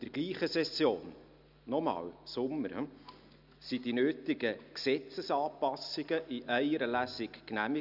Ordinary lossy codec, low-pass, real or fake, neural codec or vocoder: none; 5.4 kHz; real; none